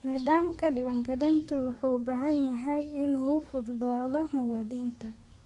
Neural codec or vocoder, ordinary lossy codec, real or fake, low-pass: codec, 24 kHz, 1 kbps, SNAC; none; fake; 10.8 kHz